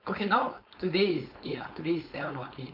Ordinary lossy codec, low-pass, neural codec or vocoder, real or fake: MP3, 48 kbps; 5.4 kHz; codec, 16 kHz, 4.8 kbps, FACodec; fake